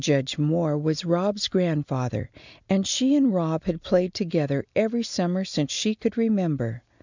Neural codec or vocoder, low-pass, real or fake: none; 7.2 kHz; real